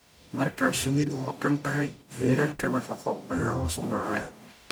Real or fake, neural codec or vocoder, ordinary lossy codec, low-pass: fake; codec, 44.1 kHz, 0.9 kbps, DAC; none; none